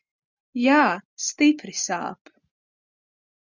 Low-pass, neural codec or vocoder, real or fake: 7.2 kHz; none; real